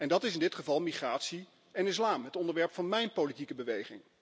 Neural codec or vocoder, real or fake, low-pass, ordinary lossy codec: none; real; none; none